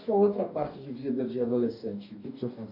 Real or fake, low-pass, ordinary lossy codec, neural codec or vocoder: fake; 5.4 kHz; none; codec, 44.1 kHz, 2.6 kbps, DAC